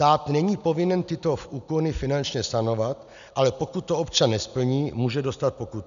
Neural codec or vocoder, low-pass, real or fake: none; 7.2 kHz; real